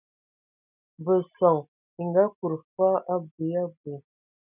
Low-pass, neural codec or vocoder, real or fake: 3.6 kHz; none; real